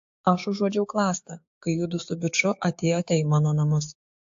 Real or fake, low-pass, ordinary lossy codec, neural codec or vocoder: fake; 7.2 kHz; AAC, 48 kbps; codec, 16 kHz, 6 kbps, DAC